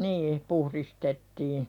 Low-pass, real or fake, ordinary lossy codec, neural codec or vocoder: 19.8 kHz; real; none; none